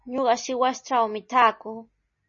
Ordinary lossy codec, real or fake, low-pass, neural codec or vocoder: MP3, 32 kbps; real; 7.2 kHz; none